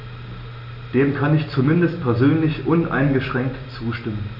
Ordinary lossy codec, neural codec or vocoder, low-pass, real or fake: none; none; 5.4 kHz; real